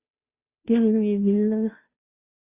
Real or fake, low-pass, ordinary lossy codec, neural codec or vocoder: fake; 3.6 kHz; Opus, 64 kbps; codec, 16 kHz, 0.5 kbps, FunCodec, trained on Chinese and English, 25 frames a second